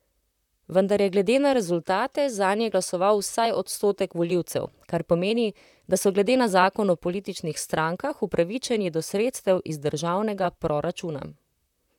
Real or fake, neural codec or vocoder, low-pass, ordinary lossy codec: fake; vocoder, 44.1 kHz, 128 mel bands, Pupu-Vocoder; 19.8 kHz; none